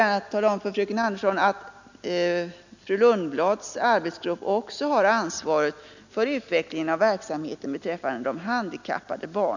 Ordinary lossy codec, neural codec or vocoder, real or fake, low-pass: none; none; real; 7.2 kHz